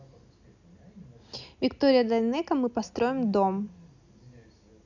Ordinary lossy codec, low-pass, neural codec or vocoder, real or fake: none; 7.2 kHz; none; real